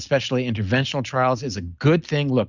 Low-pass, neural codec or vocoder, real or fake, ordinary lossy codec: 7.2 kHz; none; real; Opus, 64 kbps